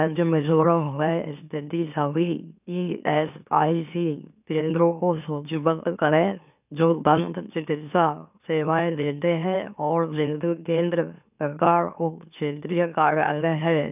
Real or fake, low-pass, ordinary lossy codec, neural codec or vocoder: fake; 3.6 kHz; none; autoencoder, 44.1 kHz, a latent of 192 numbers a frame, MeloTTS